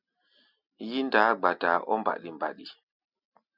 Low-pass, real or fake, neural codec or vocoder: 5.4 kHz; real; none